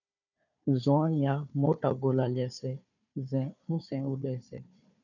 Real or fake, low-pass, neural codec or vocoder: fake; 7.2 kHz; codec, 16 kHz, 4 kbps, FunCodec, trained on Chinese and English, 50 frames a second